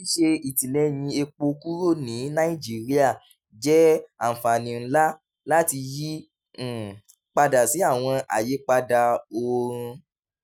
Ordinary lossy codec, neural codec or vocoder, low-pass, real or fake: none; none; none; real